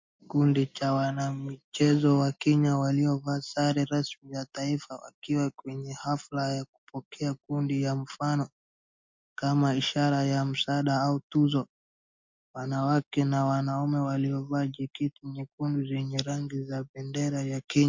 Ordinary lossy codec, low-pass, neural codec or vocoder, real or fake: MP3, 48 kbps; 7.2 kHz; none; real